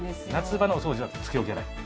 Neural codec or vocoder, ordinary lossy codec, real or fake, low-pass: none; none; real; none